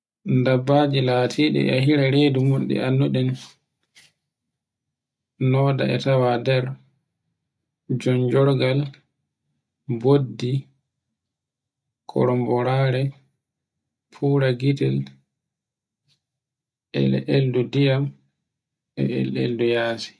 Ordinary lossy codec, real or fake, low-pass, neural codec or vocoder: none; real; none; none